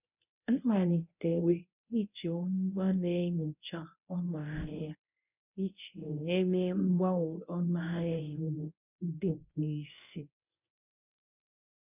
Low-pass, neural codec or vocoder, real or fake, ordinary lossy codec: 3.6 kHz; codec, 24 kHz, 0.9 kbps, WavTokenizer, medium speech release version 1; fake; none